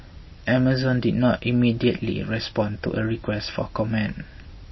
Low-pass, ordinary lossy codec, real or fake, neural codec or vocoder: 7.2 kHz; MP3, 24 kbps; real; none